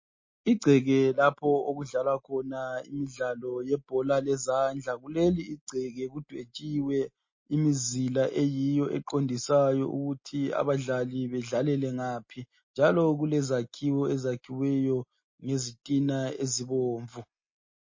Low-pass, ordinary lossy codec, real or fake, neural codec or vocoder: 7.2 kHz; MP3, 32 kbps; real; none